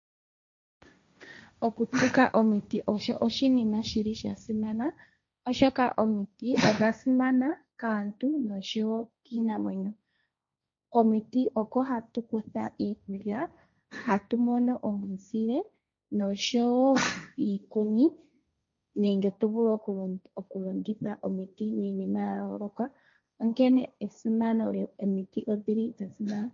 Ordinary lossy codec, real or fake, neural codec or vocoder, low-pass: MP3, 48 kbps; fake; codec, 16 kHz, 1.1 kbps, Voila-Tokenizer; 7.2 kHz